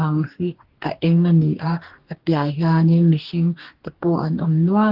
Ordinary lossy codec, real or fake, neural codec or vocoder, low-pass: Opus, 16 kbps; fake; codec, 44.1 kHz, 2.6 kbps, DAC; 5.4 kHz